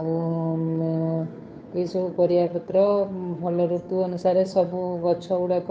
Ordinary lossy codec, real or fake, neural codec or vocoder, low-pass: none; fake; codec, 16 kHz, 2 kbps, FunCodec, trained on Chinese and English, 25 frames a second; none